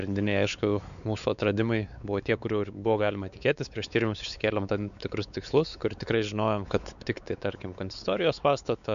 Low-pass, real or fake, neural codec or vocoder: 7.2 kHz; fake; codec, 16 kHz, 4 kbps, X-Codec, WavLM features, trained on Multilingual LibriSpeech